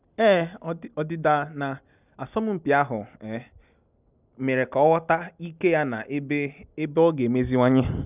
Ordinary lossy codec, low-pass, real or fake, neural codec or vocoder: none; 3.6 kHz; real; none